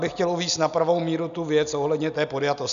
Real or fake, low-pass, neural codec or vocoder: real; 7.2 kHz; none